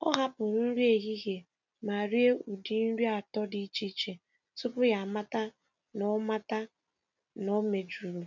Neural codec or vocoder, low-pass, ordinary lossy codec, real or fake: none; 7.2 kHz; none; real